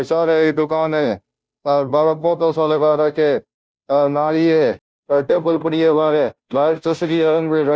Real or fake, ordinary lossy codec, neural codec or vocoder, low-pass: fake; none; codec, 16 kHz, 0.5 kbps, FunCodec, trained on Chinese and English, 25 frames a second; none